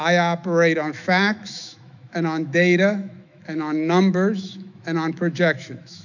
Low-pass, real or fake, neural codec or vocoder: 7.2 kHz; fake; autoencoder, 48 kHz, 128 numbers a frame, DAC-VAE, trained on Japanese speech